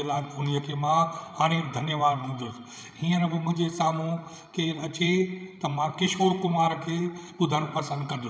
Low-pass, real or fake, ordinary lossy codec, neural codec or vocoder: none; fake; none; codec, 16 kHz, 16 kbps, FreqCodec, larger model